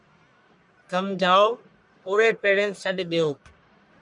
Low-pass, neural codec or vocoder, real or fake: 10.8 kHz; codec, 44.1 kHz, 1.7 kbps, Pupu-Codec; fake